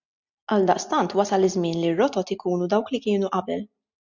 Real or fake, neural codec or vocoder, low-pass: real; none; 7.2 kHz